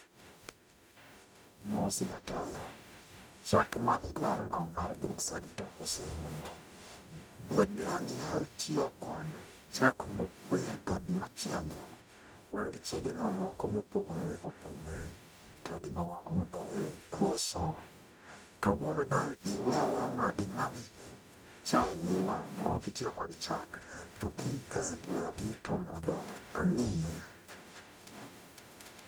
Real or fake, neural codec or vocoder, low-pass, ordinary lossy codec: fake; codec, 44.1 kHz, 0.9 kbps, DAC; none; none